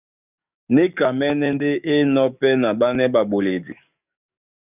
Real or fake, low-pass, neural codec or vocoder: fake; 3.6 kHz; codec, 44.1 kHz, 7.8 kbps, DAC